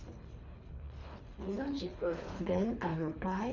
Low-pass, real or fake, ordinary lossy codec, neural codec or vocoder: 7.2 kHz; fake; none; codec, 24 kHz, 3 kbps, HILCodec